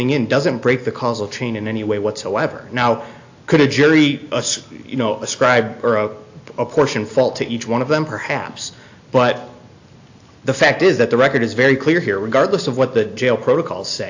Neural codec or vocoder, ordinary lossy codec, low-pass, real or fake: none; AAC, 48 kbps; 7.2 kHz; real